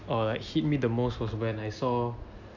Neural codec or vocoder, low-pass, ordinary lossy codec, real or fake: none; 7.2 kHz; none; real